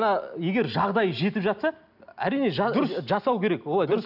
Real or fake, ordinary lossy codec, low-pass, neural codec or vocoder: real; none; 5.4 kHz; none